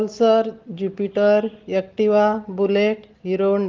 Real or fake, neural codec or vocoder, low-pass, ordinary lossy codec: real; none; 7.2 kHz; Opus, 16 kbps